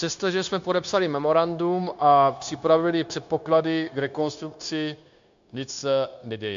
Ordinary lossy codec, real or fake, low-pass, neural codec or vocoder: AAC, 64 kbps; fake; 7.2 kHz; codec, 16 kHz, 0.9 kbps, LongCat-Audio-Codec